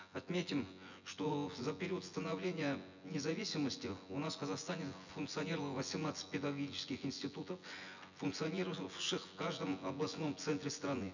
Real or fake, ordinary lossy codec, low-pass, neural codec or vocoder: fake; none; 7.2 kHz; vocoder, 24 kHz, 100 mel bands, Vocos